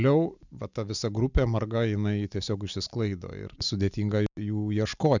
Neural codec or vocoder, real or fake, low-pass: none; real; 7.2 kHz